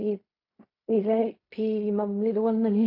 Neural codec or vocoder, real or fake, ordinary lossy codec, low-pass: codec, 16 kHz in and 24 kHz out, 0.4 kbps, LongCat-Audio-Codec, fine tuned four codebook decoder; fake; AAC, 32 kbps; 5.4 kHz